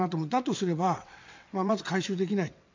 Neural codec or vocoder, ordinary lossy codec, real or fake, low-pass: vocoder, 22.05 kHz, 80 mel bands, WaveNeXt; MP3, 64 kbps; fake; 7.2 kHz